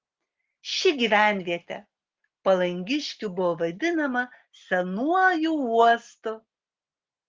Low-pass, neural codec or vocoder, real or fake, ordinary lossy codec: 7.2 kHz; none; real; Opus, 16 kbps